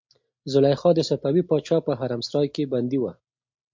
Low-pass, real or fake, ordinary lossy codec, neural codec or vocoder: 7.2 kHz; real; MP3, 48 kbps; none